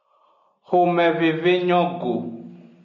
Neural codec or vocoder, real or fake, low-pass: none; real; 7.2 kHz